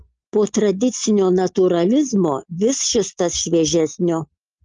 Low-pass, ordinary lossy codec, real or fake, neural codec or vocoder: 7.2 kHz; Opus, 32 kbps; real; none